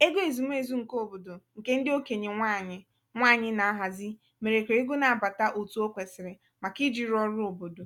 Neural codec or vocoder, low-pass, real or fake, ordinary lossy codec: none; 19.8 kHz; real; none